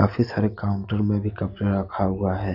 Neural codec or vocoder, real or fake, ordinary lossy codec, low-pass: none; real; none; 5.4 kHz